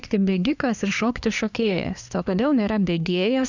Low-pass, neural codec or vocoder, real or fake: 7.2 kHz; codec, 24 kHz, 1 kbps, SNAC; fake